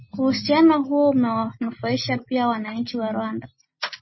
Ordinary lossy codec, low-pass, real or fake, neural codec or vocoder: MP3, 24 kbps; 7.2 kHz; real; none